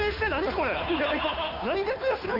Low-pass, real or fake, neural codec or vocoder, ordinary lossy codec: 5.4 kHz; fake; codec, 16 kHz, 2 kbps, FunCodec, trained on Chinese and English, 25 frames a second; AAC, 48 kbps